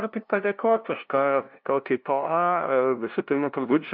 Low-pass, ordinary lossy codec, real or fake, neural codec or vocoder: 5.4 kHz; MP3, 48 kbps; fake; codec, 16 kHz, 0.5 kbps, FunCodec, trained on LibriTTS, 25 frames a second